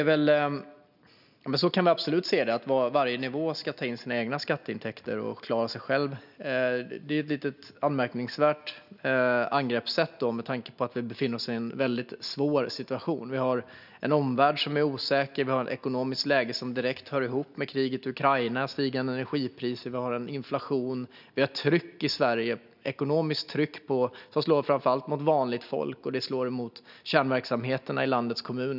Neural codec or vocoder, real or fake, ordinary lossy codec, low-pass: none; real; none; 5.4 kHz